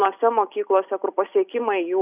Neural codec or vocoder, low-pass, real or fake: none; 3.6 kHz; real